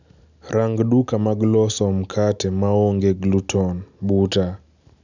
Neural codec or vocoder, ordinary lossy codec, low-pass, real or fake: none; none; 7.2 kHz; real